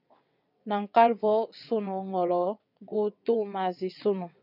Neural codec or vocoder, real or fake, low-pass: vocoder, 22.05 kHz, 80 mel bands, WaveNeXt; fake; 5.4 kHz